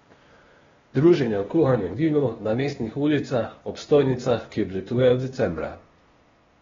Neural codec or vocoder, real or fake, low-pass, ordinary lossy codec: codec, 16 kHz, 0.8 kbps, ZipCodec; fake; 7.2 kHz; AAC, 24 kbps